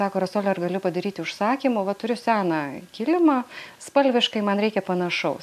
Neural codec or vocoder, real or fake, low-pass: none; real; 14.4 kHz